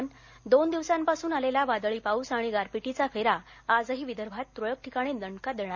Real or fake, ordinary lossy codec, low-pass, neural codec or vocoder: real; none; 7.2 kHz; none